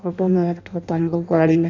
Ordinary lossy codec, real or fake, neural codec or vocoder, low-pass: none; fake; codec, 16 kHz in and 24 kHz out, 0.6 kbps, FireRedTTS-2 codec; 7.2 kHz